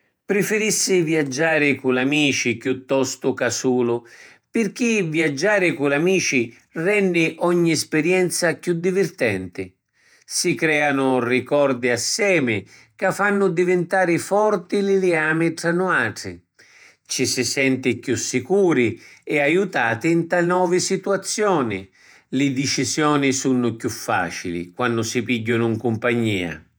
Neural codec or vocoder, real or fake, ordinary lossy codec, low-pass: vocoder, 48 kHz, 128 mel bands, Vocos; fake; none; none